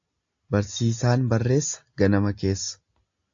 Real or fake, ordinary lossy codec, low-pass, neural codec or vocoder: real; AAC, 64 kbps; 7.2 kHz; none